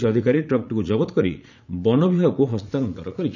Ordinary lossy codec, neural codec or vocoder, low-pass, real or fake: none; vocoder, 44.1 kHz, 80 mel bands, Vocos; 7.2 kHz; fake